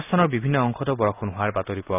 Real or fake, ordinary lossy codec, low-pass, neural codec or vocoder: real; none; 3.6 kHz; none